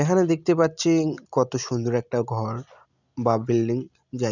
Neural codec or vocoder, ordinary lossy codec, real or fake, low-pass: none; none; real; 7.2 kHz